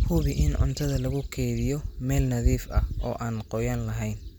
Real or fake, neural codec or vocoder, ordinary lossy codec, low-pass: real; none; none; none